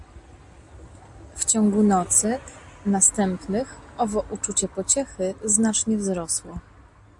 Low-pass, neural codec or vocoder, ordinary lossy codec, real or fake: 10.8 kHz; none; AAC, 64 kbps; real